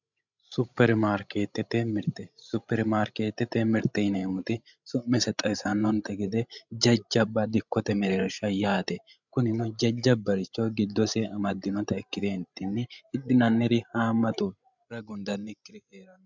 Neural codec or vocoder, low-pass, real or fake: codec, 16 kHz, 16 kbps, FreqCodec, larger model; 7.2 kHz; fake